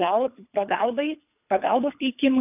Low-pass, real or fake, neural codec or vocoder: 3.6 kHz; fake; codec, 24 kHz, 3 kbps, HILCodec